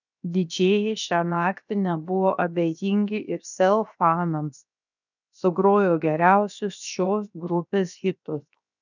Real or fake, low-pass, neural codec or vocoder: fake; 7.2 kHz; codec, 16 kHz, 0.7 kbps, FocalCodec